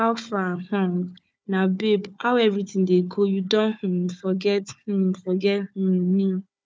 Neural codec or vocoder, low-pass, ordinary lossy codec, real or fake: codec, 16 kHz, 4 kbps, FunCodec, trained on Chinese and English, 50 frames a second; none; none; fake